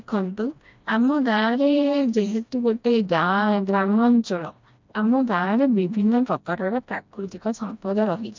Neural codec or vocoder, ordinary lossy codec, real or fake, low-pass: codec, 16 kHz, 1 kbps, FreqCodec, smaller model; MP3, 64 kbps; fake; 7.2 kHz